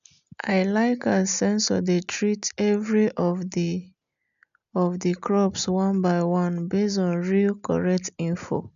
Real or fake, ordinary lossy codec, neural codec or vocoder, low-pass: real; none; none; 7.2 kHz